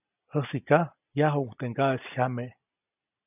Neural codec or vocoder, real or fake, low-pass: none; real; 3.6 kHz